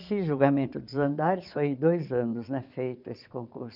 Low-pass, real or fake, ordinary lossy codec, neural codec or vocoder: 5.4 kHz; fake; none; vocoder, 22.05 kHz, 80 mel bands, Vocos